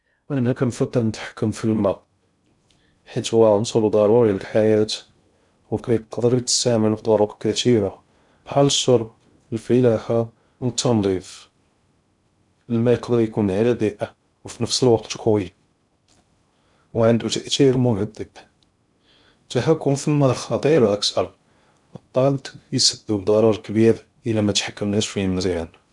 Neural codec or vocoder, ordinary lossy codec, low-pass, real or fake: codec, 16 kHz in and 24 kHz out, 0.6 kbps, FocalCodec, streaming, 2048 codes; none; 10.8 kHz; fake